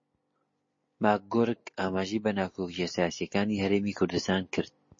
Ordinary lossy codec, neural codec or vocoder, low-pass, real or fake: MP3, 32 kbps; none; 7.2 kHz; real